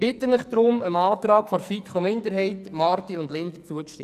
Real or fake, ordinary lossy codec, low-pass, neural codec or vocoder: fake; none; 14.4 kHz; codec, 44.1 kHz, 2.6 kbps, SNAC